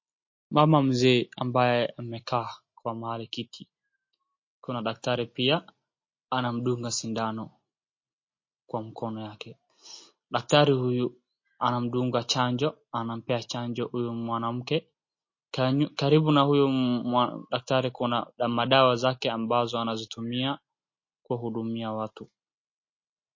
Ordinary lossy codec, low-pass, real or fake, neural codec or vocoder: MP3, 32 kbps; 7.2 kHz; real; none